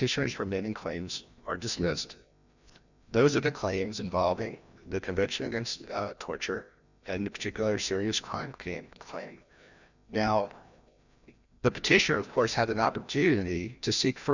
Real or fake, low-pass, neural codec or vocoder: fake; 7.2 kHz; codec, 16 kHz, 1 kbps, FreqCodec, larger model